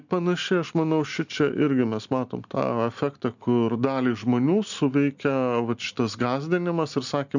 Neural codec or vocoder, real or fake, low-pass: none; real; 7.2 kHz